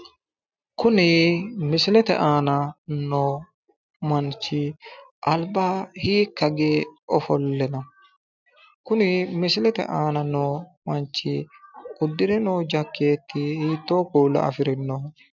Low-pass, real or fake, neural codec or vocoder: 7.2 kHz; real; none